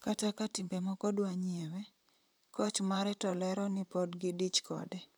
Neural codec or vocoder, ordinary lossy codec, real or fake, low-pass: vocoder, 44.1 kHz, 128 mel bands, Pupu-Vocoder; none; fake; none